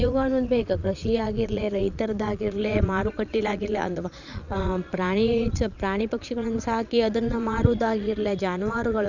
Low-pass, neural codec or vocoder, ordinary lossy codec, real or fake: 7.2 kHz; vocoder, 22.05 kHz, 80 mel bands, Vocos; none; fake